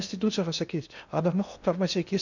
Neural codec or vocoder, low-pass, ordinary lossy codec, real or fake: codec, 16 kHz in and 24 kHz out, 0.6 kbps, FocalCodec, streaming, 2048 codes; 7.2 kHz; none; fake